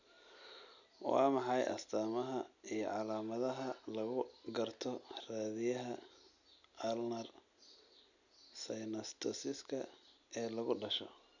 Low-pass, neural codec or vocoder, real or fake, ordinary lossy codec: 7.2 kHz; none; real; none